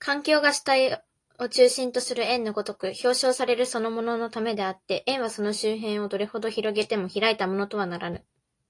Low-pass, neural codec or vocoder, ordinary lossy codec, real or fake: 10.8 kHz; none; AAC, 48 kbps; real